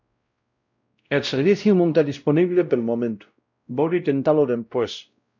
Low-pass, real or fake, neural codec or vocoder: 7.2 kHz; fake; codec, 16 kHz, 0.5 kbps, X-Codec, WavLM features, trained on Multilingual LibriSpeech